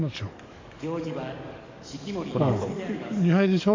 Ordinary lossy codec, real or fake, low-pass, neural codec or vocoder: MP3, 48 kbps; fake; 7.2 kHz; codec, 44.1 kHz, 7.8 kbps, DAC